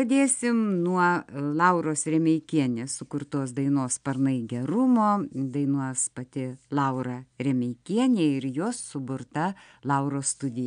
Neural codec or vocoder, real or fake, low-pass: none; real; 9.9 kHz